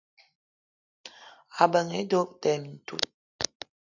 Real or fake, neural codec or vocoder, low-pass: real; none; 7.2 kHz